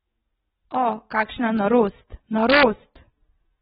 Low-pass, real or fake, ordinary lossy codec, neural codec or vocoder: 19.8 kHz; real; AAC, 16 kbps; none